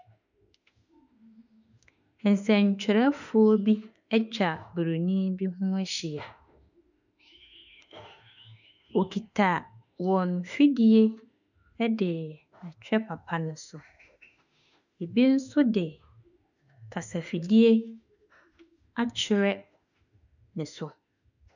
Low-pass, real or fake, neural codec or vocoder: 7.2 kHz; fake; autoencoder, 48 kHz, 32 numbers a frame, DAC-VAE, trained on Japanese speech